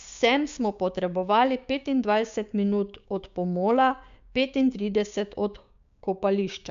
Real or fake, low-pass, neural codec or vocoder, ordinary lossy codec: fake; 7.2 kHz; codec, 16 kHz, 6 kbps, DAC; MP3, 64 kbps